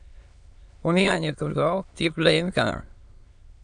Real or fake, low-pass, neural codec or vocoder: fake; 9.9 kHz; autoencoder, 22.05 kHz, a latent of 192 numbers a frame, VITS, trained on many speakers